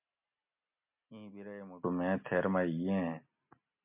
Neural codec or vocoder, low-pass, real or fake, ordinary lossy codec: none; 3.6 kHz; real; MP3, 32 kbps